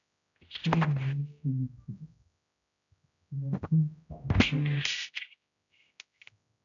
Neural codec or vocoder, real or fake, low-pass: codec, 16 kHz, 0.5 kbps, X-Codec, HuBERT features, trained on general audio; fake; 7.2 kHz